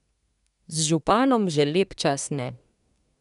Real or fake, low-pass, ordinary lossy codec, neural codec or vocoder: fake; 10.8 kHz; none; codec, 24 kHz, 1 kbps, SNAC